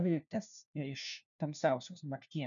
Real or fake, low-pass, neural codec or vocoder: fake; 7.2 kHz; codec, 16 kHz, 1 kbps, FunCodec, trained on LibriTTS, 50 frames a second